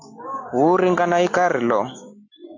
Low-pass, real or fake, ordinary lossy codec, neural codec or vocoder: 7.2 kHz; real; AAC, 48 kbps; none